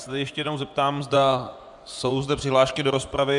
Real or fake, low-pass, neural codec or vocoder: fake; 10.8 kHz; vocoder, 24 kHz, 100 mel bands, Vocos